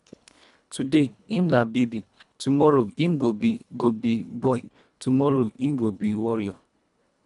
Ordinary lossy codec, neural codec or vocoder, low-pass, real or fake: none; codec, 24 kHz, 1.5 kbps, HILCodec; 10.8 kHz; fake